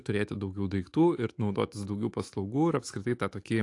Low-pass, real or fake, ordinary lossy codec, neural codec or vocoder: 10.8 kHz; fake; AAC, 48 kbps; autoencoder, 48 kHz, 128 numbers a frame, DAC-VAE, trained on Japanese speech